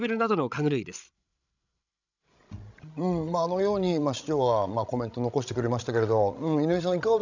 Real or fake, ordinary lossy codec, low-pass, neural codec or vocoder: fake; none; 7.2 kHz; codec, 16 kHz, 16 kbps, FreqCodec, larger model